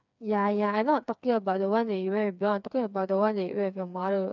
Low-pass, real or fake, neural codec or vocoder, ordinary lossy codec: 7.2 kHz; fake; codec, 16 kHz, 4 kbps, FreqCodec, smaller model; none